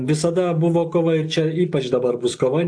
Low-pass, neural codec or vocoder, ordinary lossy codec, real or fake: 9.9 kHz; none; AAC, 64 kbps; real